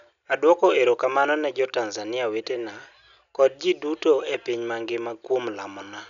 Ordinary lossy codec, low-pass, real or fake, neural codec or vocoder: none; 7.2 kHz; real; none